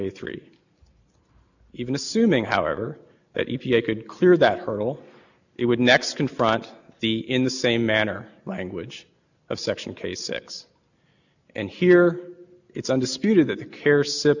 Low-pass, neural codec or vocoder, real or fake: 7.2 kHz; none; real